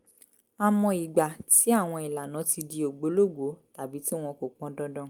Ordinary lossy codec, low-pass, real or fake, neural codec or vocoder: Opus, 32 kbps; 19.8 kHz; real; none